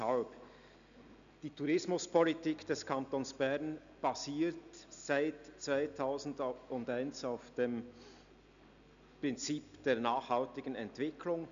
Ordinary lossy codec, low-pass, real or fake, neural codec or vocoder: none; 7.2 kHz; real; none